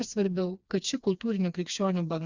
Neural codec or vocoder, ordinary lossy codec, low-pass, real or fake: codec, 16 kHz, 2 kbps, FreqCodec, smaller model; Opus, 64 kbps; 7.2 kHz; fake